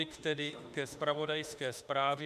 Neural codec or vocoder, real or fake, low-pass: autoencoder, 48 kHz, 32 numbers a frame, DAC-VAE, trained on Japanese speech; fake; 14.4 kHz